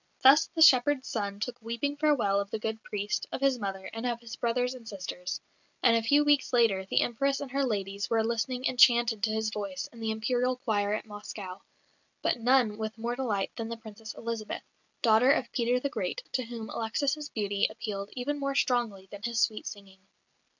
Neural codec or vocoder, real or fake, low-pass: none; real; 7.2 kHz